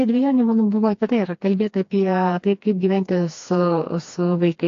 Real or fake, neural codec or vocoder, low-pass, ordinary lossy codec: fake; codec, 16 kHz, 2 kbps, FreqCodec, smaller model; 7.2 kHz; AAC, 48 kbps